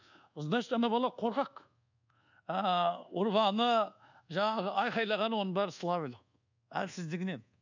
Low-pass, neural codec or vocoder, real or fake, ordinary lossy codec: 7.2 kHz; codec, 24 kHz, 1.2 kbps, DualCodec; fake; none